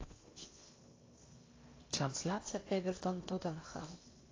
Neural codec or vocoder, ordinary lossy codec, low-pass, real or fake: codec, 16 kHz in and 24 kHz out, 0.8 kbps, FocalCodec, streaming, 65536 codes; AAC, 32 kbps; 7.2 kHz; fake